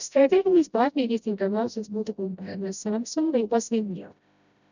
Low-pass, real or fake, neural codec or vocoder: 7.2 kHz; fake; codec, 16 kHz, 0.5 kbps, FreqCodec, smaller model